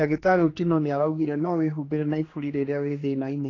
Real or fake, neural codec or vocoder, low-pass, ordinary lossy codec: fake; codec, 16 kHz, 2 kbps, X-Codec, HuBERT features, trained on general audio; 7.2 kHz; AAC, 32 kbps